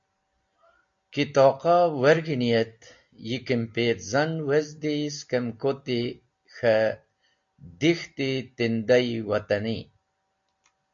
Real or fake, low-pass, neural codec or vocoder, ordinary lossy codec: real; 7.2 kHz; none; MP3, 48 kbps